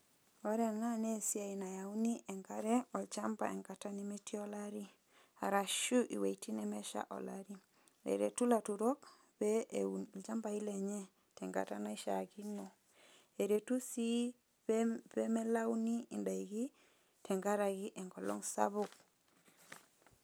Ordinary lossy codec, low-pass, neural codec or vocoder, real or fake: none; none; none; real